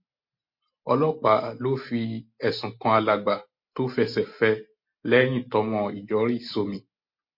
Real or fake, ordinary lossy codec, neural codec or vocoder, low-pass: real; MP3, 32 kbps; none; 5.4 kHz